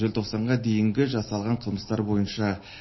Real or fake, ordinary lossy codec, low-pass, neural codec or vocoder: real; MP3, 24 kbps; 7.2 kHz; none